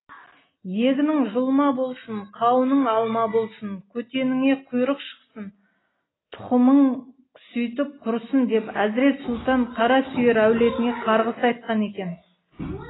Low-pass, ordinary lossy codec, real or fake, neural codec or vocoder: 7.2 kHz; AAC, 16 kbps; real; none